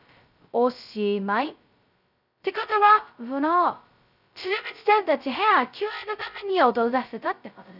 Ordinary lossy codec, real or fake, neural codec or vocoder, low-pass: none; fake; codec, 16 kHz, 0.2 kbps, FocalCodec; 5.4 kHz